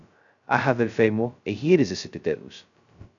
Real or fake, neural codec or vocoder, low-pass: fake; codec, 16 kHz, 0.2 kbps, FocalCodec; 7.2 kHz